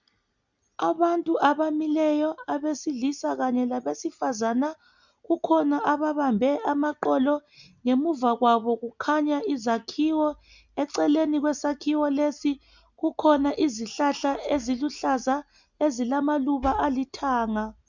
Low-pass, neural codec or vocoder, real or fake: 7.2 kHz; none; real